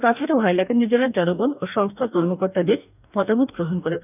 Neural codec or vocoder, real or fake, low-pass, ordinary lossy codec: codec, 44.1 kHz, 2.6 kbps, DAC; fake; 3.6 kHz; none